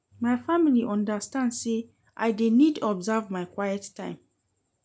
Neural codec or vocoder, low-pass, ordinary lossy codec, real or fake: none; none; none; real